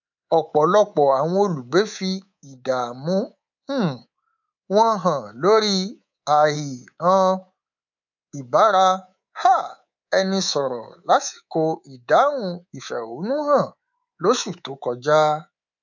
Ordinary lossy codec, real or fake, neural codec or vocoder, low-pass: none; fake; codec, 24 kHz, 3.1 kbps, DualCodec; 7.2 kHz